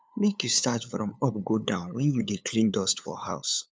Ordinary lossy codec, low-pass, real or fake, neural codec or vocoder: none; none; fake; codec, 16 kHz, 8 kbps, FunCodec, trained on LibriTTS, 25 frames a second